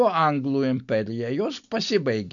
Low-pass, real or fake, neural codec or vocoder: 7.2 kHz; real; none